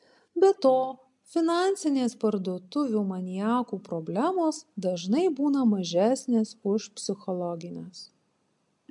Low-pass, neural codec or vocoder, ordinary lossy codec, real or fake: 10.8 kHz; none; MP3, 64 kbps; real